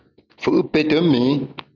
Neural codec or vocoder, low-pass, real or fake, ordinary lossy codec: none; 7.2 kHz; real; MP3, 64 kbps